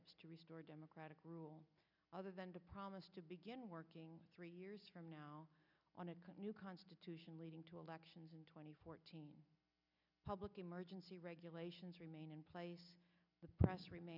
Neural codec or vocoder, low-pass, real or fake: none; 5.4 kHz; real